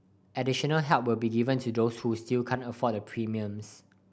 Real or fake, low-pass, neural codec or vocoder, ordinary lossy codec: real; none; none; none